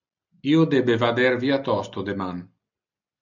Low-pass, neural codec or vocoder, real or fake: 7.2 kHz; none; real